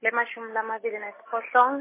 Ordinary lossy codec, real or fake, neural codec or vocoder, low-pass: MP3, 16 kbps; real; none; 3.6 kHz